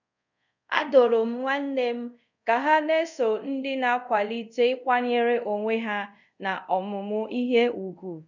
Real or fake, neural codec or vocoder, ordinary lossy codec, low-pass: fake; codec, 24 kHz, 0.5 kbps, DualCodec; none; 7.2 kHz